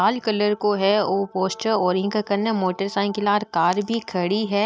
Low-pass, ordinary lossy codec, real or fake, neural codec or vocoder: none; none; real; none